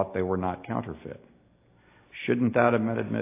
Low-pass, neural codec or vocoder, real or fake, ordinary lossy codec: 3.6 kHz; none; real; AAC, 24 kbps